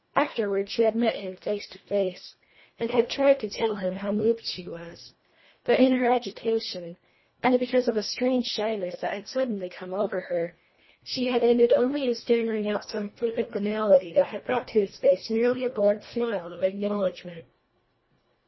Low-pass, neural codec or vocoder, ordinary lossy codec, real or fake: 7.2 kHz; codec, 24 kHz, 1.5 kbps, HILCodec; MP3, 24 kbps; fake